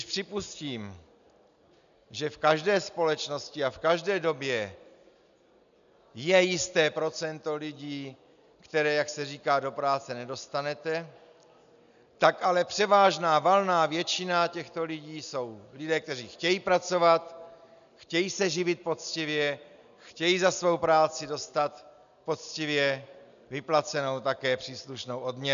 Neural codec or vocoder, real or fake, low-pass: none; real; 7.2 kHz